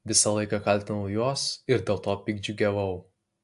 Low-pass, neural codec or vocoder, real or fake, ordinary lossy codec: 10.8 kHz; none; real; AAC, 64 kbps